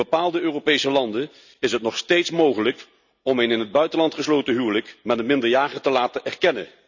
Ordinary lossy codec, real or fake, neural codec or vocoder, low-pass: none; real; none; 7.2 kHz